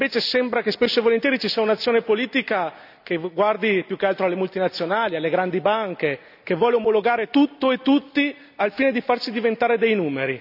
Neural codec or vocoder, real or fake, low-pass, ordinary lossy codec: none; real; 5.4 kHz; none